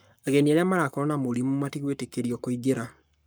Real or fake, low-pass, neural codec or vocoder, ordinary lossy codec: fake; none; codec, 44.1 kHz, 7.8 kbps, Pupu-Codec; none